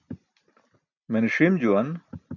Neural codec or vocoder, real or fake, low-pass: none; real; 7.2 kHz